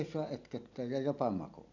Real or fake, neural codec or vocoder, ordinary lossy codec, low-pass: real; none; none; 7.2 kHz